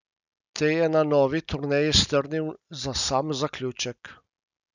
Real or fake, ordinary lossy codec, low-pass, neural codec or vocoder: real; none; 7.2 kHz; none